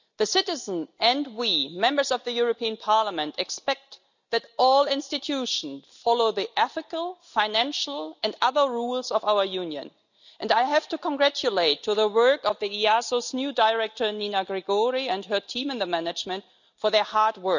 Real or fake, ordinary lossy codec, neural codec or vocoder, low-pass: real; none; none; 7.2 kHz